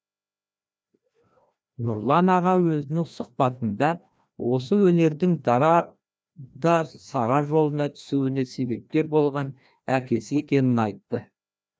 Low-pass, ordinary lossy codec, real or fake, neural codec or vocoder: none; none; fake; codec, 16 kHz, 1 kbps, FreqCodec, larger model